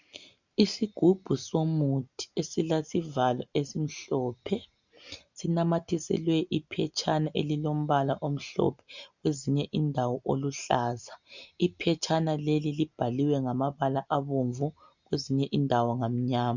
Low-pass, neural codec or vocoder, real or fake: 7.2 kHz; none; real